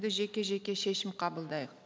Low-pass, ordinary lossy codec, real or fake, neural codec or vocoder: none; none; real; none